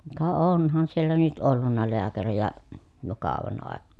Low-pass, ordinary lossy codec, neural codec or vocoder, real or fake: none; none; none; real